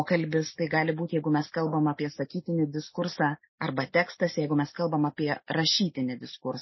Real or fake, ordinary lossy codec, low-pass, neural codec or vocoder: real; MP3, 24 kbps; 7.2 kHz; none